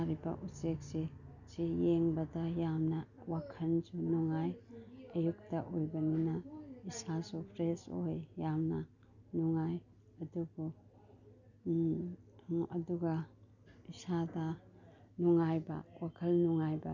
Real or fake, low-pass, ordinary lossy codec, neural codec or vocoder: real; 7.2 kHz; none; none